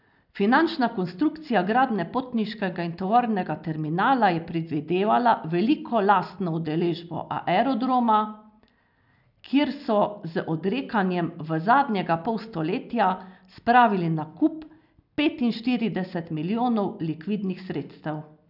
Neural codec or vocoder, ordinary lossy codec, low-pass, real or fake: none; none; 5.4 kHz; real